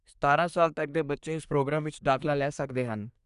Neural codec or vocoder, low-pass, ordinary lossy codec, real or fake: codec, 24 kHz, 1 kbps, SNAC; 10.8 kHz; none; fake